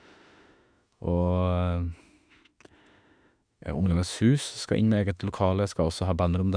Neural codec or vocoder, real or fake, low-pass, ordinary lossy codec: autoencoder, 48 kHz, 32 numbers a frame, DAC-VAE, trained on Japanese speech; fake; 9.9 kHz; none